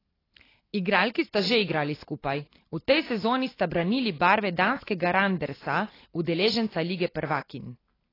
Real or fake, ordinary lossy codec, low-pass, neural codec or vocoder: real; AAC, 24 kbps; 5.4 kHz; none